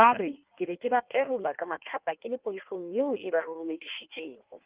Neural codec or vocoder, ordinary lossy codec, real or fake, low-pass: codec, 16 kHz in and 24 kHz out, 1.1 kbps, FireRedTTS-2 codec; Opus, 32 kbps; fake; 3.6 kHz